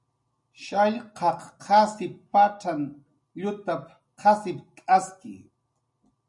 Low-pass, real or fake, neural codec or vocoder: 10.8 kHz; real; none